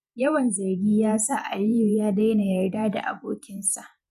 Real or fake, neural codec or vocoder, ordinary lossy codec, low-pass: fake; vocoder, 48 kHz, 128 mel bands, Vocos; MP3, 96 kbps; 14.4 kHz